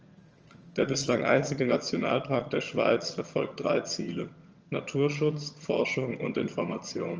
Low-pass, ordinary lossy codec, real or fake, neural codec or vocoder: 7.2 kHz; Opus, 24 kbps; fake; vocoder, 22.05 kHz, 80 mel bands, HiFi-GAN